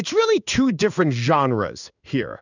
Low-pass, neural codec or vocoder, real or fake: 7.2 kHz; codec, 16 kHz in and 24 kHz out, 1 kbps, XY-Tokenizer; fake